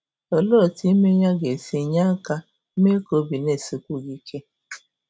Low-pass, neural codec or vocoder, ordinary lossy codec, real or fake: none; none; none; real